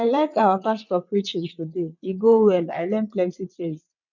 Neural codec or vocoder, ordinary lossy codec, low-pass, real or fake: vocoder, 22.05 kHz, 80 mel bands, WaveNeXt; none; 7.2 kHz; fake